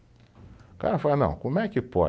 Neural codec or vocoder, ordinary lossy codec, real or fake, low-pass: none; none; real; none